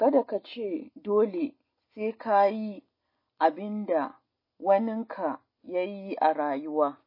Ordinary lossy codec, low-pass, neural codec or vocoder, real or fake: MP3, 24 kbps; 5.4 kHz; none; real